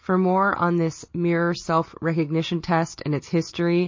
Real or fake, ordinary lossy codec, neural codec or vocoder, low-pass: real; MP3, 32 kbps; none; 7.2 kHz